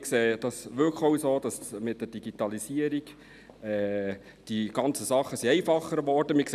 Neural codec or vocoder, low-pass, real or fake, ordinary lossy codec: none; 14.4 kHz; real; none